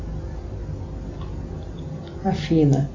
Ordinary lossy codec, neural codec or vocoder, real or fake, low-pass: MP3, 48 kbps; none; real; 7.2 kHz